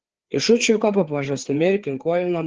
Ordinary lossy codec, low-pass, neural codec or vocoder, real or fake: Opus, 16 kbps; 7.2 kHz; codec, 16 kHz, 4 kbps, FreqCodec, larger model; fake